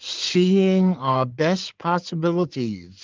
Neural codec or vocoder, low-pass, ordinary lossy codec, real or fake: codec, 16 kHz in and 24 kHz out, 2.2 kbps, FireRedTTS-2 codec; 7.2 kHz; Opus, 32 kbps; fake